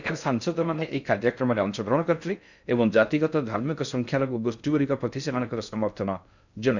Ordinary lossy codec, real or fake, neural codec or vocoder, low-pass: none; fake; codec, 16 kHz in and 24 kHz out, 0.6 kbps, FocalCodec, streaming, 4096 codes; 7.2 kHz